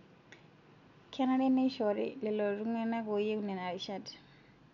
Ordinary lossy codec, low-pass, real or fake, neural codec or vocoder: none; 7.2 kHz; real; none